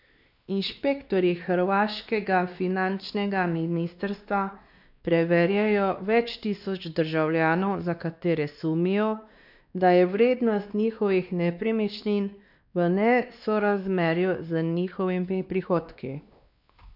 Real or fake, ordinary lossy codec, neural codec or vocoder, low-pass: fake; none; codec, 16 kHz, 2 kbps, X-Codec, WavLM features, trained on Multilingual LibriSpeech; 5.4 kHz